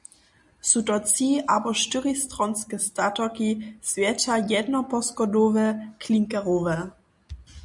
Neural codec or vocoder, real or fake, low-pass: none; real; 10.8 kHz